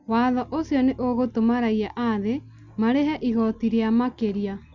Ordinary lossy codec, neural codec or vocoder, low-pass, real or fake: none; none; 7.2 kHz; real